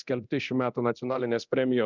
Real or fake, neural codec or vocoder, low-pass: fake; codec, 24 kHz, 0.9 kbps, DualCodec; 7.2 kHz